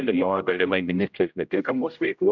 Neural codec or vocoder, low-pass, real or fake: codec, 16 kHz, 0.5 kbps, X-Codec, HuBERT features, trained on general audio; 7.2 kHz; fake